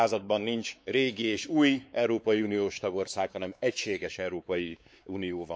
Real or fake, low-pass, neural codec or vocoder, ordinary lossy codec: fake; none; codec, 16 kHz, 4 kbps, X-Codec, WavLM features, trained on Multilingual LibriSpeech; none